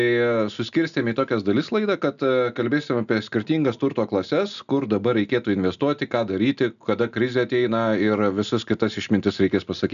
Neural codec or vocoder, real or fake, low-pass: none; real; 7.2 kHz